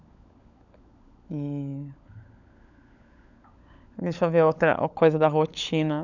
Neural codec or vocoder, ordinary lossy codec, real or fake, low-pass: codec, 16 kHz, 16 kbps, FunCodec, trained on LibriTTS, 50 frames a second; none; fake; 7.2 kHz